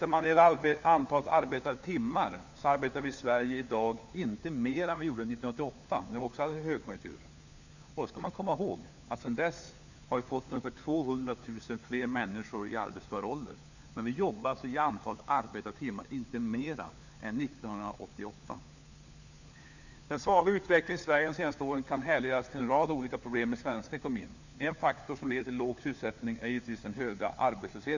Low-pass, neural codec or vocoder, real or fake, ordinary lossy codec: 7.2 kHz; codec, 16 kHz, 4 kbps, FunCodec, trained on LibriTTS, 50 frames a second; fake; none